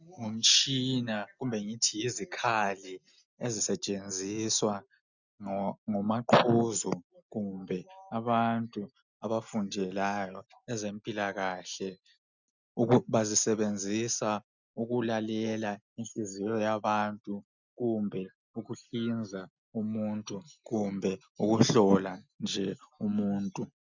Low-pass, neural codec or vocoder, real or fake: 7.2 kHz; none; real